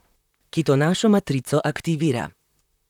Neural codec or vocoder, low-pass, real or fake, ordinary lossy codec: vocoder, 44.1 kHz, 128 mel bands, Pupu-Vocoder; 19.8 kHz; fake; none